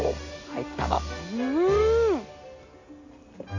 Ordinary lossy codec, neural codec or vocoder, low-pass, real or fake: AAC, 48 kbps; none; 7.2 kHz; real